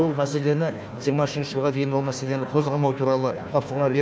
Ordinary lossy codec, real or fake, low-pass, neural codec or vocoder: none; fake; none; codec, 16 kHz, 1 kbps, FunCodec, trained on Chinese and English, 50 frames a second